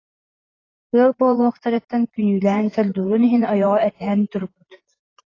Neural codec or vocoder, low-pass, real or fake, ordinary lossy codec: vocoder, 44.1 kHz, 128 mel bands every 512 samples, BigVGAN v2; 7.2 kHz; fake; AAC, 32 kbps